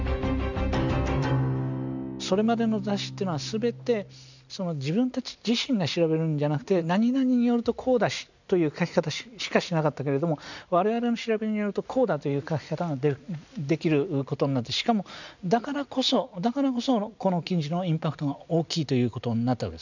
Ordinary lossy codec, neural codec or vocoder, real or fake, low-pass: none; none; real; 7.2 kHz